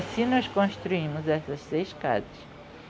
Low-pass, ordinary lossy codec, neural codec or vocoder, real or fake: none; none; none; real